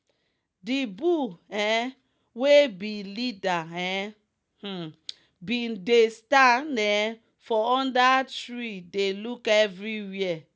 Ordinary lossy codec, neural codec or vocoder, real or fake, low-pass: none; none; real; none